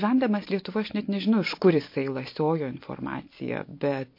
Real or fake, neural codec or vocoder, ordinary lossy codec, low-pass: real; none; MP3, 32 kbps; 5.4 kHz